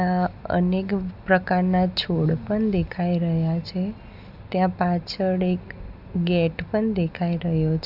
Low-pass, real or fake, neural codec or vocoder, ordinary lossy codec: 5.4 kHz; real; none; none